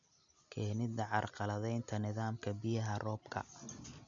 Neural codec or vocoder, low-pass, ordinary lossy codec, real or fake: none; 7.2 kHz; MP3, 64 kbps; real